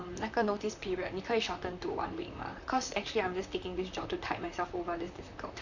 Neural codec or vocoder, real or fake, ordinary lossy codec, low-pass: vocoder, 44.1 kHz, 128 mel bands, Pupu-Vocoder; fake; none; 7.2 kHz